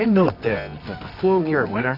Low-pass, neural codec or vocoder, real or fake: 5.4 kHz; codec, 24 kHz, 0.9 kbps, WavTokenizer, medium music audio release; fake